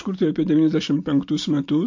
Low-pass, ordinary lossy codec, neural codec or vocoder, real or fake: 7.2 kHz; MP3, 48 kbps; none; real